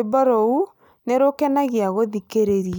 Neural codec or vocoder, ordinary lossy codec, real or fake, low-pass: none; none; real; none